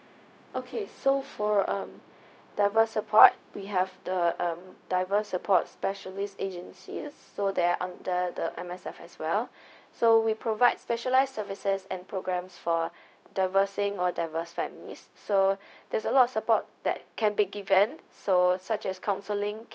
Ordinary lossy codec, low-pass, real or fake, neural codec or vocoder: none; none; fake; codec, 16 kHz, 0.4 kbps, LongCat-Audio-Codec